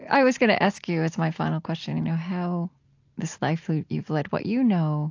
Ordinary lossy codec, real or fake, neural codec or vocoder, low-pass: AAC, 48 kbps; real; none; 7.2 kHz